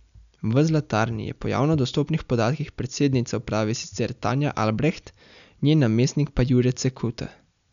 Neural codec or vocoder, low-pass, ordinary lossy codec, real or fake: none; 7.2 kHz; none; real